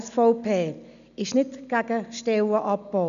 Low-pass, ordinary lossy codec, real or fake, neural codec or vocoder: 7.2 kHz; none; real; none